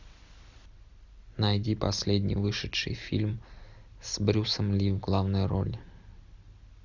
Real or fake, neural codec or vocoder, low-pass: real; none; 7.2 kHz